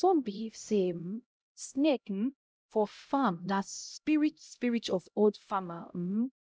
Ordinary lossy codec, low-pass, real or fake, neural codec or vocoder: none; none; fake; codec, 16 kHz, 0.5 kbps, X-Codec, HuBERT features, trained on LibriSpeech